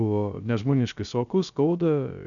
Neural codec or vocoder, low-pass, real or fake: codec, 16 kHz, 0.3 kbps, FocalCodec; 7.2 kHz; fake